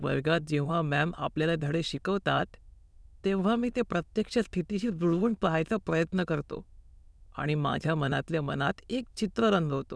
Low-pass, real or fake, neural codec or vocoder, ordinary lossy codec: none; fake; autoencoder, 22.05 kHz, a latent of 192 numbers a frame, VITS, trained on many speakers; none